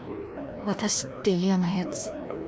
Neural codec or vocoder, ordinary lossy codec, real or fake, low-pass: codec, 16 kHz, 1 kbps, FreqCodec, larger model; none; fake; none